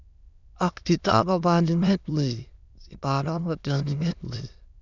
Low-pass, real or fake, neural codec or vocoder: 7.2 kHz; fake; autoencoder, 22.05 kHz, a latent of 192 numbers a frame, VITS, trained on many speakers